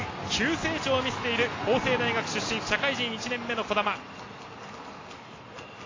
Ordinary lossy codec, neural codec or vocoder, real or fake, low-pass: AAC, 32 kbps; none; real; 7.2 kHz